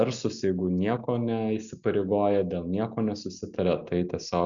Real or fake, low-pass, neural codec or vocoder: real; 7.2 kHz; none